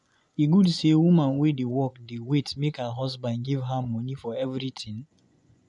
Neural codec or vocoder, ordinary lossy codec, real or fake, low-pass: vocoder, 24 kHz, 100 mel bands, Vocos; none; fake; 10.8 kHz